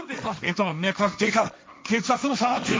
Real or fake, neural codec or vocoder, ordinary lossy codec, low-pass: fake; codec, 16 kHz, 1.1 kbps, Voila-Tokenizer; none; none